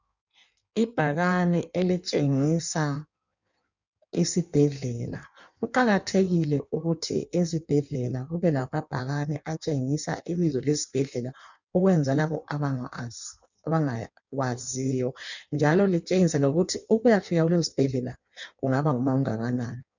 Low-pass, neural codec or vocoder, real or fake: 7.2 kHz; codec, 16 kHz in and 24 kHz out, 1.1 kbps, FireRedTTS-2 codec; fake